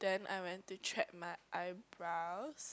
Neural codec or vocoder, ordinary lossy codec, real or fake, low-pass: none; none; real; none